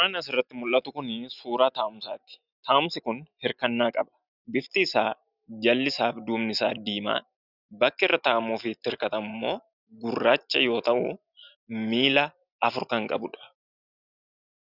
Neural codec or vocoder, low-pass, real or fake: none; 5.4 kHz; real